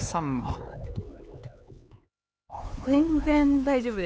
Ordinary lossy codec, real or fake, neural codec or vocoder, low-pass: none; fake; codec, 16 kHz, 4 kbps, X-Codec, HuBERT features, trained on LibriSpeech; none